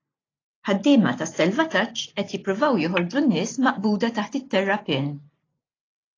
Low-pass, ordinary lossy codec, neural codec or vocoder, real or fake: 7.2 kHz; AAC, 32 kbps; none; real